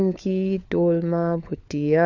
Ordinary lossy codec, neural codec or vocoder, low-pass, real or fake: none; codec, 16 kHz, 4 kbps, FunCodec, trained on LibriTTS, 50 frames a second; 7.2 kHz; fake